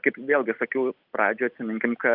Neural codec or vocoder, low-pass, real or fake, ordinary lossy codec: none; 5.4 kHz; real; Opus, 24 kbps